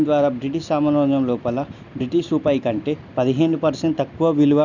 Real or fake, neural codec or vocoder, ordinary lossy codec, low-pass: real; none; none; 7.2 kHz